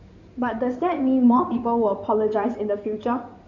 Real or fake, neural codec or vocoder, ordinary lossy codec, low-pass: fake; codec, 16 kHz in and 24 kHz out, 2.2 kbps, FireRedTTS-2 codec; none; 7.2 kHz